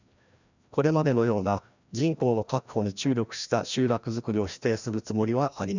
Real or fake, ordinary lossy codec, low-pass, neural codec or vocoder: fake; none; 7.2 kHz; codec, 16 kHz, 1 kbps, FreqCodec, larger model